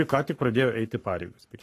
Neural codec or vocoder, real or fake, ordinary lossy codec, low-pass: codec, 44.1 kHz, 7.8 kbps, Pupu-Codec; fake; AAC, 48 kbps; 14.4 kHz